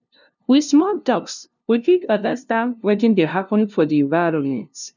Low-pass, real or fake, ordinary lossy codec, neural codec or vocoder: 7.2 kHz; fake; none; codec, 16 kHz, 0.5 kbps, FunCodec, trained on LibriTTS, 25 frames a second